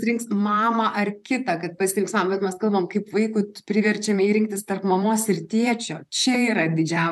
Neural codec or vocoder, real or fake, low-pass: vocoder, 44.1 kHz, 128 mel bands, Pupu-Vocoder; fake; 14.4 kHz